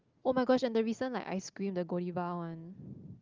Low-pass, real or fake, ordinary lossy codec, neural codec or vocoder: 7.2 kHz; real; Opus, 32 kbps; none